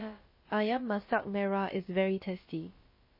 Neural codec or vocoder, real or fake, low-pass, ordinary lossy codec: codec, 16 kHz, about 1 kbps, DyCAST, with the encoder's durations; fake; 5.4 kHz; MP3, 24 kbps